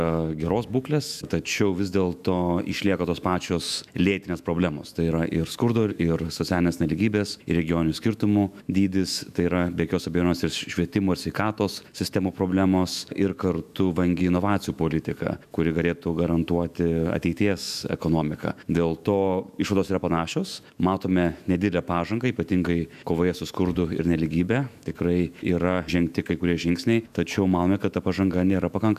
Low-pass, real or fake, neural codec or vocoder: 14.4 kHz; fake; autoencoder, 48 kHz, 128 numbers a frame, DAC-VAE, trained on Japanese speech